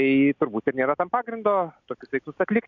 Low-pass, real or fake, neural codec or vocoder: 7.2 kHz; real; none